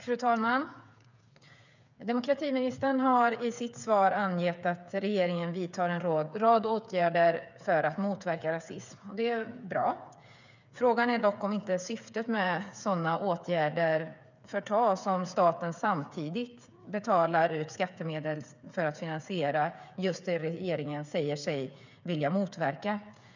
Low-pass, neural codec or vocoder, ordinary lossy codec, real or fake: 7.2 kHz; codec, 16 kHz, 8 kbps, FreqCodec, smaller model; none; fake